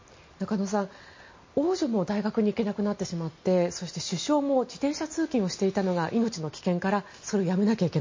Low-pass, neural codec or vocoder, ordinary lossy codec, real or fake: 7.2 kHz; none; MP3, 32 kbps; real